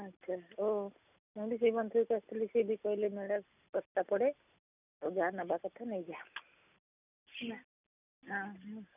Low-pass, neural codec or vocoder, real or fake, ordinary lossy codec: 3.6 kHz; none; real; none